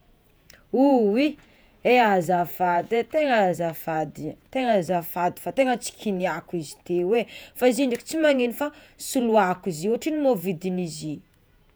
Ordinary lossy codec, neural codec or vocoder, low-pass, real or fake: none; vocoder, 48 kHz, 128 mel bands, Vocos; none; fake